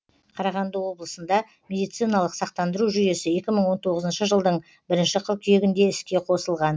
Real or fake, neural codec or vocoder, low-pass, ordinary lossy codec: real; none; none; none